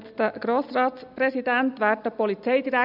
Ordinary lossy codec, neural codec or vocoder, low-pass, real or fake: none; none; 5.4 kHz; real